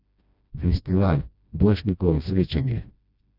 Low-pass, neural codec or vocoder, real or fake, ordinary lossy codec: 5.4 kHz; codec, 16 kHz, 1 kbps, FreqCodec, smaller model; fake; none